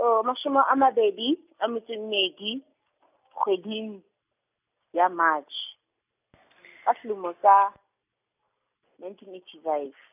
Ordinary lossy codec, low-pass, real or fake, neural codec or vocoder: none; 3.6 kHz; real; none